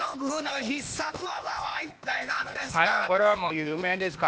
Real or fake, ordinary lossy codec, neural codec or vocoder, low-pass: fake; none; codec, 16 kHz, 0.8 kbps, ZipCodec; none